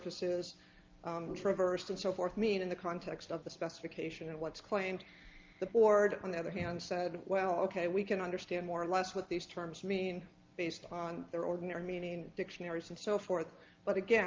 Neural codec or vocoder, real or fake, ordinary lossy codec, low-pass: none; real; Opus, 32 kbps; 7.2 kHz